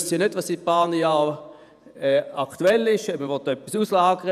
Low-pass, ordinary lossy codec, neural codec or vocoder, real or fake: 14.4 kHz; none; vocoder, 48 kHz, 128 mel bands, Vocos; fake